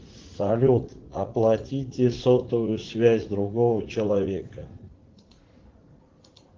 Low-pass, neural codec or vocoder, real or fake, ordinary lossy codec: 7.2 kHz; vocoder, 44.1 kHz, 80 mel bands, Vocos; fake; Opus, 16 kbps